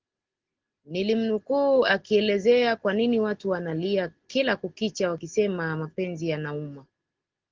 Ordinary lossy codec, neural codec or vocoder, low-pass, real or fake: Opus, 16 kbps; none; 7.2 kHz; real